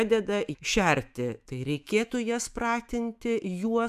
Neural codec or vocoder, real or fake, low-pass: autoencoder, 48 kHz, 128 numbers a frame, DAC-VAE, trained on Japanese speech; fake; 14.4 kHz